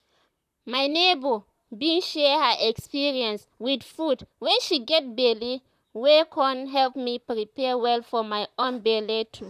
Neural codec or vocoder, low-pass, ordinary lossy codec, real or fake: vocoder, 44.1 kHz, 128 mel bands, Pupu-Vocoder; 14.4 kHz; none; fake